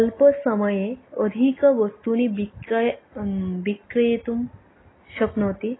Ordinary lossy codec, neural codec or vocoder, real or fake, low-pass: AAC, 16 kbps; none; real; 7.2 kHz